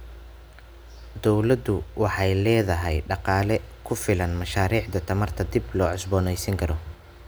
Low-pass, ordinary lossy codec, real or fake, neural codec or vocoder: none; none; real; none